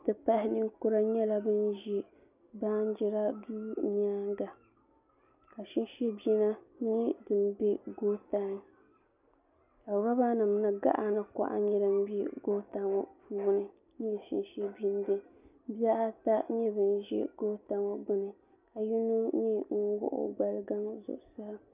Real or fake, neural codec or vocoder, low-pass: real; none; 3.6 kHz